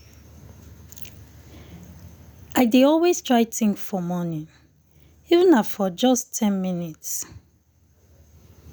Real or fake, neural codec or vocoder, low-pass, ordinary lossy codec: real; none; none; none